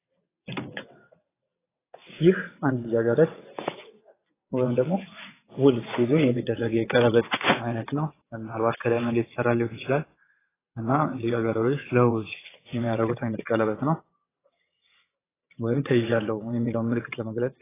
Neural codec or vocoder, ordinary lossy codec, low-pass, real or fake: vocoder, 22.05 kHz, 80 mel bands, WaveNeXt; AAC, 16 kbps; 3.6 kHz; fake